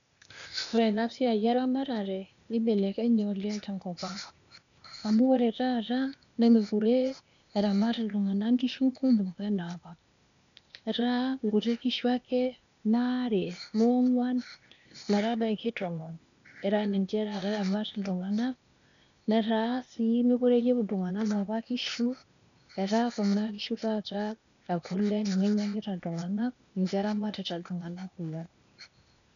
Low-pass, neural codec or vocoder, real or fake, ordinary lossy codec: 7.2 kHz; codec, 16 kHz, 0.8 kbps, ZipCodec; fake; none